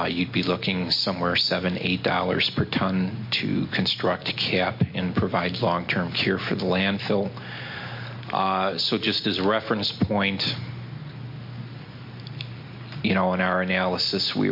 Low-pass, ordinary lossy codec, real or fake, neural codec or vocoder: 5.4 kHz; MP3, 32 kbps; real; none